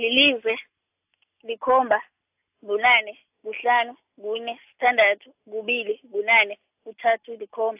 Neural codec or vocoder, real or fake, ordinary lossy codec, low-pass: none; real; AAC, 32 kbps; 3.6 kHz